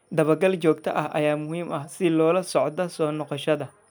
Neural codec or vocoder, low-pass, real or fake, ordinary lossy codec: none; none; real; none